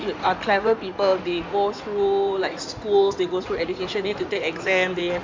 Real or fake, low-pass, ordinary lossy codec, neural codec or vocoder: fake; 7.2 kHz; MP3, 64 kbps; codec, 16 kHz in and 24 kHz out, 2.2 kbps, FireRedTTS-2 codec